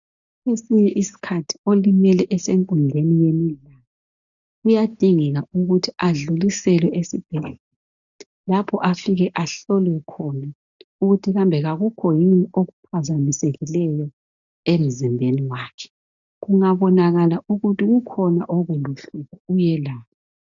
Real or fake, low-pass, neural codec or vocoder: real; 7.2 kHz; none